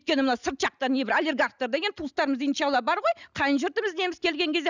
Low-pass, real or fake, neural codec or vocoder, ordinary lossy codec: 7.2 kHz; real; none; none